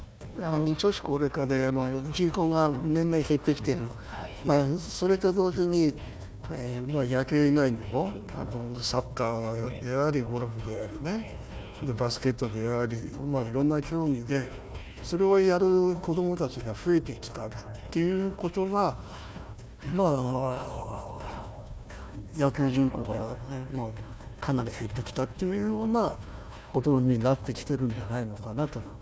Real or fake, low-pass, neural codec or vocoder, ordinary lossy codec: fake; none; codec, 16 kHz, 1 kbps, FunCodec, trained on Chinese and English, 50 frames a second; none